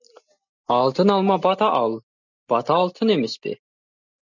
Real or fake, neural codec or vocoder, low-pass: real; none; 7.2 kHz